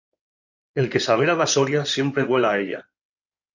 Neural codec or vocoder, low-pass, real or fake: codec, 16 kHz in and 24 kHz out, 2.2 kbps, FireRedTTS-2 codec; 7.2 kHz; fake